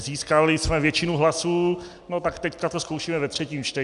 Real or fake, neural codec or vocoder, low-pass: real; none; 10.8 kHz